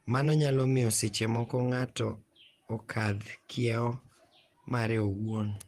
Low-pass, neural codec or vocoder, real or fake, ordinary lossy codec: 14.4 kHz; vocoder, 48 kHz, 128 mel bands, Vocos; fake; Opus, 24 kbps